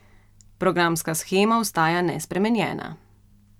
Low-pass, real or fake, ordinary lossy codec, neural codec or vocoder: 19.8 kHz; real; none; none